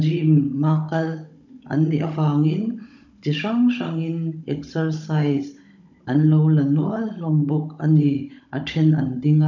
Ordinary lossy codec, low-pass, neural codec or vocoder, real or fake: none; 7.2 kHz; codec, 16 kHz, 16 kbps, FunCodec, trained on Chinese and English, 50 frames a second; fake